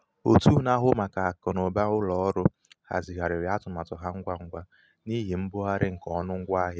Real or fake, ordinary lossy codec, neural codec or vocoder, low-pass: real; none; none; none